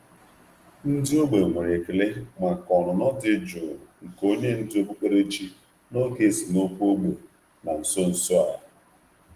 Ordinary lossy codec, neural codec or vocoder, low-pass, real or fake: Opus, 24 kbps; none; 14.4 kHz; real